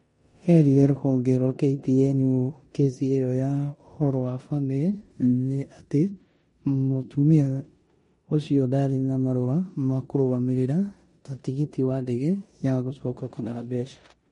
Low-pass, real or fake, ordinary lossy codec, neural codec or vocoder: 10.8 kHz; fake; MP3, 48 kbps; codec, 16 kHz in and 24 kHz out, 0.9 kbps, LongCat-Audio-Codec, four codebook decoder